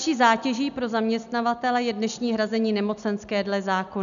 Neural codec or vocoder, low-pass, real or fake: none; 7.2 kHz; real